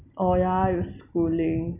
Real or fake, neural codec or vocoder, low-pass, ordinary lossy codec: real; none; 3.6 kHz; none